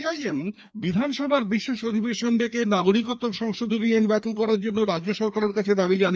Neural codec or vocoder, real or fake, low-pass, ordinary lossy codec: codec, 16 kHz, 2 kbps, FreqCodec, larger model; fake; none; none